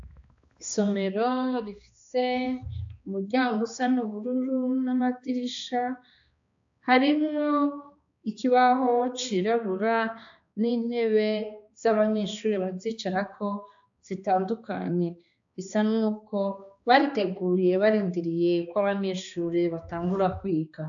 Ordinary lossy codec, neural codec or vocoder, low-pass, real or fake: AAC, 64 kbps; codec, 16 kHz, 2 kbps, X-Codec, HuBERT features, trained on balanced general audio; 7.2 kHz; fake